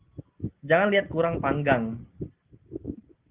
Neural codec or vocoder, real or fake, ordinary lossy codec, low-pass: none; real; Opus, 16 kbps; 3.6 kHz